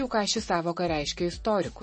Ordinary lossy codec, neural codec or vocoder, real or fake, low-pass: MP3, 32 kbps; none; real; 10.8 kHz